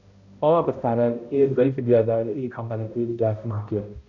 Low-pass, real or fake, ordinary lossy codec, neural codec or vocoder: 7.2 kHz; fake; none; codec, 16 kHz, 0.5 kbps, X-Codec, HuBERT features, trained on balanced general audio